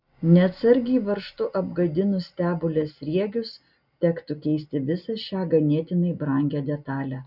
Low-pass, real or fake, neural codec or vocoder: 5.4 kHz; real; none